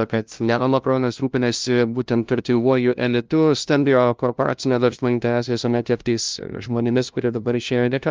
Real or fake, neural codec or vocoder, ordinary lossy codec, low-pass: fake; codec, 16 kHz, 0.5 kbps, FunCodec, trained on LibriTTS, 25 frames a second; Opus, 24 kbps; 7.2 kHz